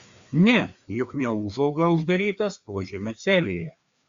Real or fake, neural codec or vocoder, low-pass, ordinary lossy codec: fake; codec, 16 kHz, 2 kbps, FreqCodec, larger model; 7.2 kHz; Opus, 64 kbps